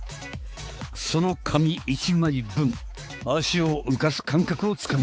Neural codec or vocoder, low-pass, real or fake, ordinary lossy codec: codec, 16 kHz, 4 kbps, X-Codec, HuBERT features, trained on balanced general audio; none; fake; none